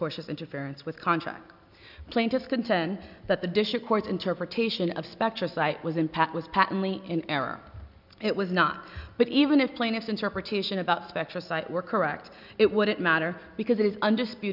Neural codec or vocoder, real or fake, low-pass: none; real; 5.4 kHz